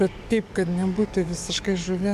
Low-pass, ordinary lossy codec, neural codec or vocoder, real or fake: 14.4 kHz; AAC, 96 kbps; codec, 44.1 kHz, 7.8 kbps, DAC; fake